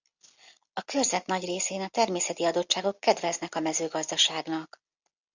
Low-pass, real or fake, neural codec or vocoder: 7.2 kHz; real; none